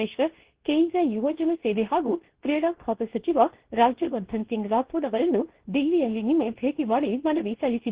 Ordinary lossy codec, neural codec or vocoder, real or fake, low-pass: Opus, 16 kbps; codec, 24 kHz, 0.9 kbps, WavTokenizer, medium speech release version 2; fake; 3.6 kHz